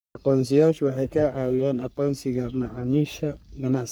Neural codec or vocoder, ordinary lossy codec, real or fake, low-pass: codec, 44.1 kHz, 3.4 kbps, Pupu-Codec; none; fake; none